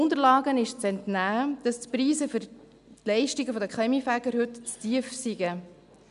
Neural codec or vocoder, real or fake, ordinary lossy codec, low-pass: none; real; none; 10.8 kHz